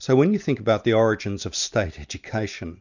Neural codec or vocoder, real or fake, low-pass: none; real; 7.2 kHz